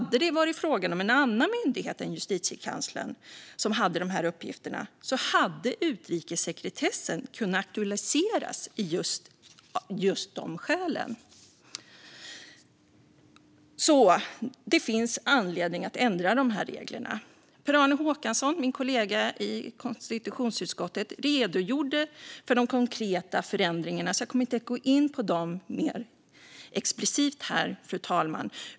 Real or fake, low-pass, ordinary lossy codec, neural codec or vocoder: real; none; none; none